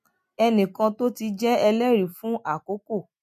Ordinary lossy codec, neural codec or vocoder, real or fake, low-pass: MP3, 64 kbps; none; real; 14.4 kHz